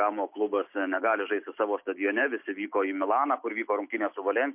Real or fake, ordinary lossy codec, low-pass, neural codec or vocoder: real; MP3, 32 kbps; 3.6 kHz; none